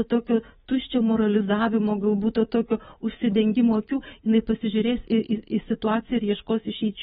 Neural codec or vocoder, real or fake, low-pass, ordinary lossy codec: codec, 24 kHz, 3.1 kbps, DualCodec; fake; 10.8 kHz; AAC, 16 kbps